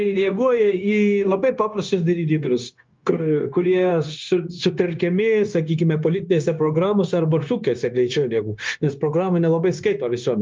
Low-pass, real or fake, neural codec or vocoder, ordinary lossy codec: 7.2 kHz; fake; codec, 16 kHz, 0.9 kbps, LongCat-Audio-Codec; Opus, 24 kbps